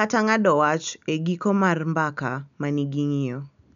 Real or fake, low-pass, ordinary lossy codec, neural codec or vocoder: real; 7.2 kHz; none; none